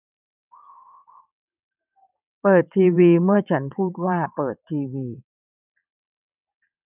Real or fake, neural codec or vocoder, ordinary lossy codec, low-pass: fake; vocoder, 22.05 kHz, 80 mel bands, WaveNeXt; none; 3.6 kHz